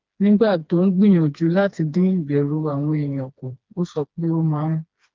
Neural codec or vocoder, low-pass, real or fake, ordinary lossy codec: codec, 16 kHz, 2 kbps, FreqCodec, smaller model; 7.2 kHz; fake; Opus, 32 kbps